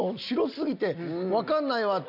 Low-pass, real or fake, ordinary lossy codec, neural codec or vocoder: 5.4 kHz; real; none; none